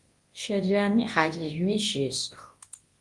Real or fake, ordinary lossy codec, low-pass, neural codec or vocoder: fake; Opus, 24 kbps; 10.8 kHz; codec, 24 kHz, 0.9 kbps, WavTokenizer, large speech release